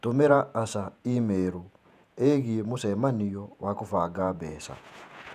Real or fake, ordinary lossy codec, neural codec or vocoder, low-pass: fake; none; vocoder, 48 kHz, 128 mel bands, Vocos; 14.4 kHz